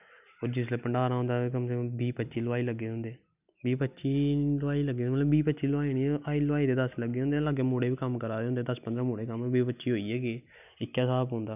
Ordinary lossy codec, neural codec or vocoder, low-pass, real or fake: none; none; 3.6 kHz; real